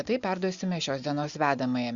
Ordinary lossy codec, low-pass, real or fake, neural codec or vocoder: Opus, 64 kbps; 7.2 kHz; real; none